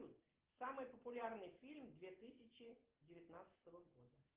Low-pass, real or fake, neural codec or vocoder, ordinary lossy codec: 3.6 kHz; real; none; Opus, 16 kbps